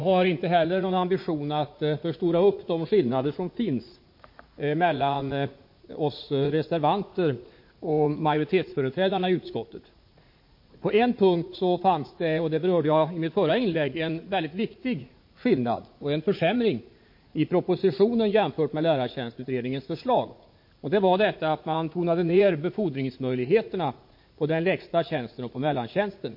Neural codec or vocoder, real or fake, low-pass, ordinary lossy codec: vocoder, 44.1 kHz, 80 mel bands, Vocos; fake; 5.4 kHz; MP3, 32 kbps